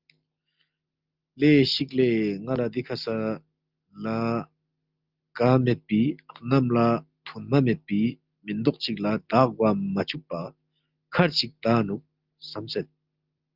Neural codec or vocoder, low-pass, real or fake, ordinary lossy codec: none; 5.4 kHz; real; Opus, 24 kbps